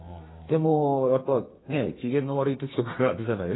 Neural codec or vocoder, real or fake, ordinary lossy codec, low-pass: codec, 32 kHz, 1.9 kbps, SNAC; fake; AAC, 16 kbps; 7.2 kHz